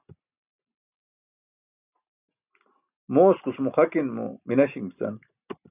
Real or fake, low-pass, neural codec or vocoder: real; 3.6 kHz; none